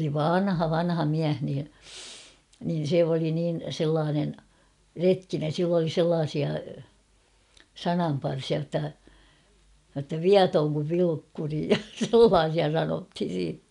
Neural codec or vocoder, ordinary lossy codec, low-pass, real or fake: none; none; 10.8 kHz; real